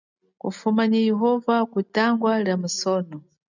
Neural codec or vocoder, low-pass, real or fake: none; 7.2 kHz; real